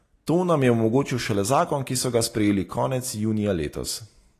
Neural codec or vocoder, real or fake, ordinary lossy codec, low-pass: none; real; AAC, 48 kbps; 14.4 kHz